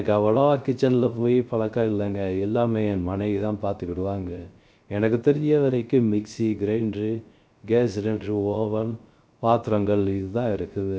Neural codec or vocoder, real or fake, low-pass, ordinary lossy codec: codec, 16 kHz, 0.3 kbps, FocalCodec; fake; none; none